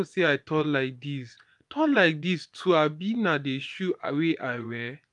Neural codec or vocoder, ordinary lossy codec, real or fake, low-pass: vocoder, 24 kHz, 100 mel bands, Vocos; none; fake; 10.8 kHz